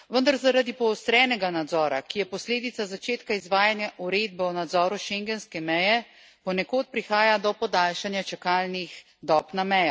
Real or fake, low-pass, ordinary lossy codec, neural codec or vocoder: real; none; none; none